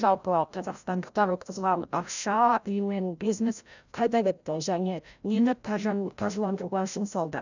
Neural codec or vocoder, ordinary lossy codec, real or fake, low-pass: codec, 16 kHz, 0.5 kbps, FreqCodec, larger model; none; fake; 7.2 kHz